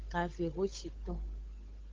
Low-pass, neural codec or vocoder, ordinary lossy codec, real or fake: 7.2 kHz; codec, 16 kHz, 8 kbps, FunCodec, trained on Chinese and English, 25 frames a second; Opus, 32 kbps; fake